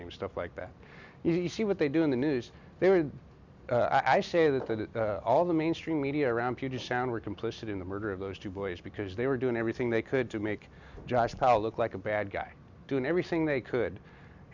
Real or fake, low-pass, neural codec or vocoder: real; 7.2 kHz; none